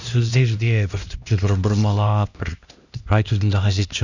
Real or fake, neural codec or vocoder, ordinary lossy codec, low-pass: fake; codec, 16 kHz, 1 kbps, X-Codec, HuBERT features, trained on LibriSpeech; none; 7.2 kHz